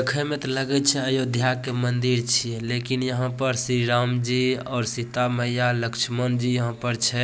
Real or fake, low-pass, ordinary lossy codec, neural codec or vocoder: real; none; none; none